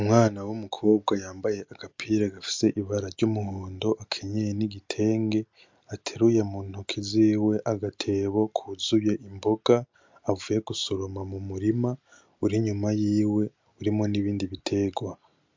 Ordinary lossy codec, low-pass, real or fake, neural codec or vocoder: MP3, 64 kbps; 7.2 kHz; real; none